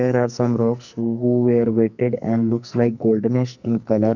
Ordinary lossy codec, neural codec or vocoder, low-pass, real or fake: none; codec, 44.1 kHz, 2.6 kbps, SNAC; 7.2 kHz; fake